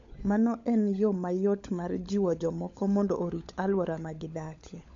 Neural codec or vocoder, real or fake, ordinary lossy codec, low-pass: codec, 16 kHz, 16 kbps, FunCodec, trained on LibriTTS, 50 frames a second; fake; MP3, 48 kbps; 7.2 kHz